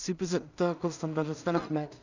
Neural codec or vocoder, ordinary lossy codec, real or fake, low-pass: codec, 16 kHz in and 24 kHz out, 0.4 kbps, LongCat-Audio-Codec, two codebook decoder; none; fake; 7.2 kHz